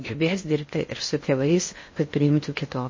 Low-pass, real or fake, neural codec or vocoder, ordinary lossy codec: 7.2 kHz; fake; codec, 16 kHz in and 24 kHz out, 0.6 kbps, FocalCodec, streaming, 4096 codes; MP3, 32 kbps